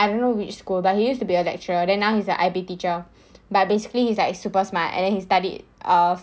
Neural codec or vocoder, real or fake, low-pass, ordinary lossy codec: none; real; none; none